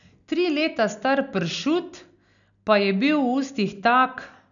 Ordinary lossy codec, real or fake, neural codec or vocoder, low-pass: none; real; none; 7.2 kHz